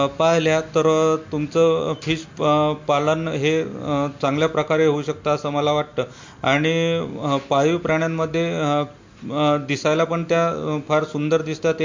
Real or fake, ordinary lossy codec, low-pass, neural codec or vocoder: real; MP3, 48 kbps; 7.2 kHz; none